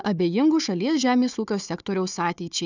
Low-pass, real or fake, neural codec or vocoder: 7.2 kHz; fake; codec, 16 kHz, 4 kbps, FunCodec, trained on Chinese and English, 50 frames a second